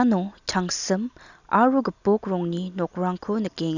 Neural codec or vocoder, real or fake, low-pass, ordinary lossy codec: none; real; 7.2 kHz; none